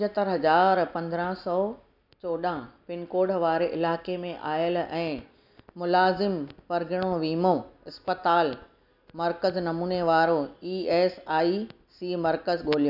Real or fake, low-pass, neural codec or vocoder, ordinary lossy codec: real; 5.4 kHz; none; none